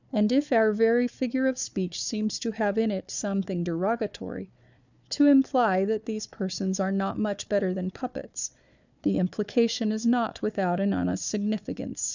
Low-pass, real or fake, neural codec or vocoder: 7.2 kHz; fake; codec, 16 kHz, 4 kbps, FunCodec, trained on Chinese and English, 50 frames a second